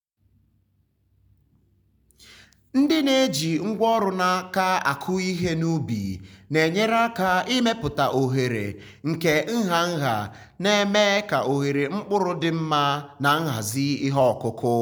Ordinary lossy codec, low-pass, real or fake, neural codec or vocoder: none; none; real; none